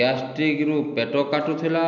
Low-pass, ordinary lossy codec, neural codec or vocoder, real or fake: 7.2 kHz; none; none; real